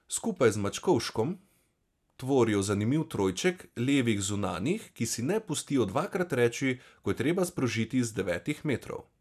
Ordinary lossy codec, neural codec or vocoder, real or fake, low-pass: none; none; real; 14.4 kHz